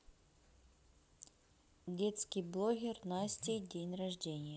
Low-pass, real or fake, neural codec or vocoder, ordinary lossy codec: none; real; none; none